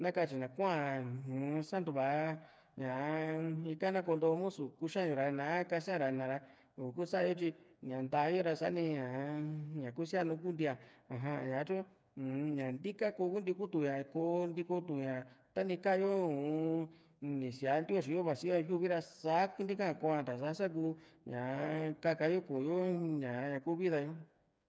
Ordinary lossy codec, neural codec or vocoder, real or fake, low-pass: none; codec, 16 kHz, 4 kbps, FreqCodec, smaller model; fake; none